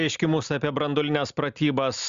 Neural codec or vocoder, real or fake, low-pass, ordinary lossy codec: none; real; 7.2 kHz; Opus, 64 kbps